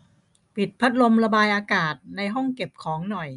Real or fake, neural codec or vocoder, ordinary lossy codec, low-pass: real; none; none; 10.8 kHz